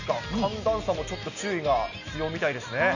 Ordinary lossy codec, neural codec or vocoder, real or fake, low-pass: none; none; real; 7.2 kHz